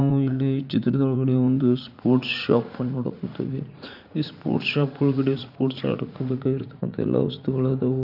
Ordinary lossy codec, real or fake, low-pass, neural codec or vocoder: none; fake; 5.4 kHz; vocoder, 44.1 kHz, 80 mel bands, Vocos